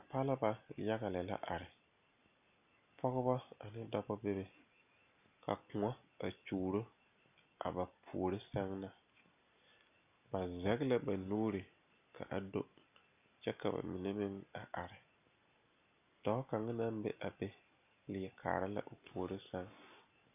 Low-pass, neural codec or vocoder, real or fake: 3.6 kHz; none; real